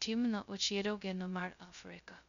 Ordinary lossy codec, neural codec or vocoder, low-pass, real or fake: none; codec, 16 kHz, 0.2 kbps, FocalCodec; 7.2 kHz; fake